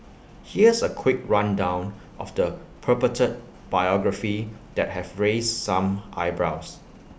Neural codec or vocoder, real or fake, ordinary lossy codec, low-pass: none; real; none; none